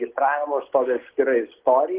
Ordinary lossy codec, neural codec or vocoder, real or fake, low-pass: Opus, 16 kbps; none; real; 3.6 kHz